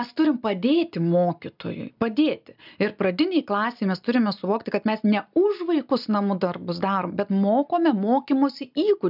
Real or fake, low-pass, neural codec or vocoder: real; 5.4 kHz; none